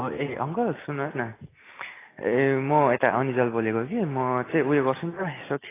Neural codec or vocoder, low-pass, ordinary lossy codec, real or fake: none; 3.6 kHz; AAC, 16 kbps; real